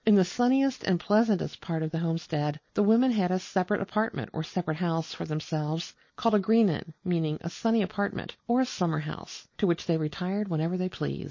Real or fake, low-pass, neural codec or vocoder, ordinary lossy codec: real; 7.2 kHz; none; MP3, 32 kbps